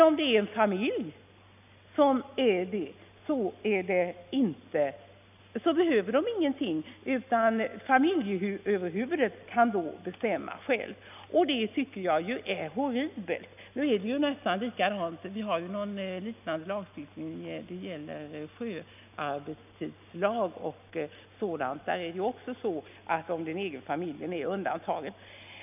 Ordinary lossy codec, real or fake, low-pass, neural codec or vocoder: none; real; 3.6 kHz; none